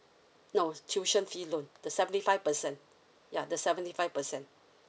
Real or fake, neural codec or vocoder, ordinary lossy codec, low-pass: real; none; none; none